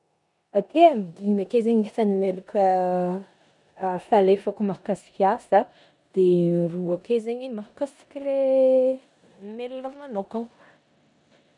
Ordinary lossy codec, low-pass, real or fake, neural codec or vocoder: none; 10.8 kHz; fake; codec, 16 kHz in and 24 kHz out, 0.9 kbps, LongCat-Audio-Codec, four codebook decoder